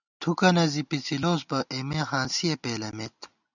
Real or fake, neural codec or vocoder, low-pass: real; none; 7.2 kHz